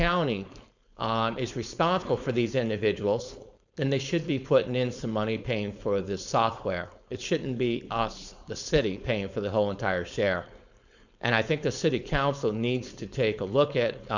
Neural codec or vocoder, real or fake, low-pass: codec, 16 kHz, 4.8 kbps, FACodec; fake; 7.2 kHz